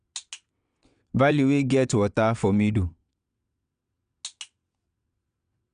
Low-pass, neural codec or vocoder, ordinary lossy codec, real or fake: 9.9 kHz; vocoder, 22.05 kHz, 80 mel bands, WaveNeXt; none; fake